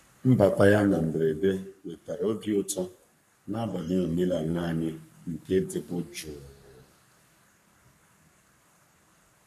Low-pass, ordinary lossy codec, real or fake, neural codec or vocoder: 14.4 kHz; none; fake; codec, 44.1 kHz, 3.4 kbps, Pupu-Codec